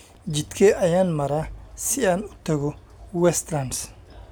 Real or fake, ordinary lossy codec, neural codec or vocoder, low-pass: real; none; none; none